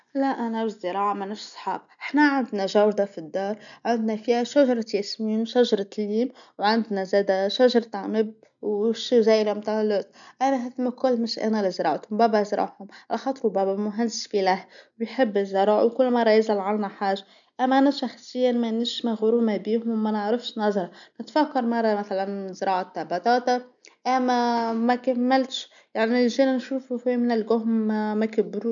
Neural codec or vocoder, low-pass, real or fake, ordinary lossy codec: none; 7.2 kHz; real; none